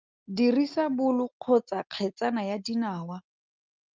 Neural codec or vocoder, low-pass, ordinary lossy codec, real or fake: none; 7.2 kHz; Opus, 24 kbps; real